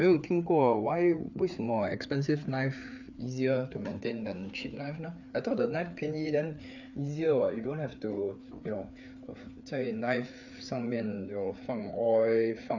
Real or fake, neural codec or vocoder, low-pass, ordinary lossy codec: fake; codec, 16 kHz, 4 kbps, FreqCodec, larger model; 7.2 kHz; none